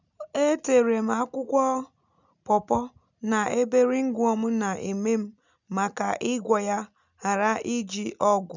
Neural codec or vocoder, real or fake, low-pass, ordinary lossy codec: none; real; 7.2 kHz; none